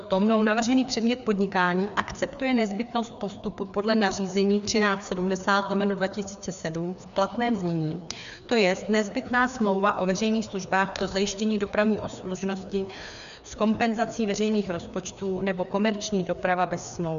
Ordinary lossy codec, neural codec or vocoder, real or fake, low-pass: MP3, 96 kbps; codec, 16 kHz, 2 kbps, FreqCodec, larger model; fake; 7.2 kHz